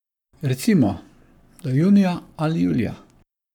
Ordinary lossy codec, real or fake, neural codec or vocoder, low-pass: none; fake; codec, 44.1 kHz, 7.8 kbps, Pupu-Codec; 19.8 kHz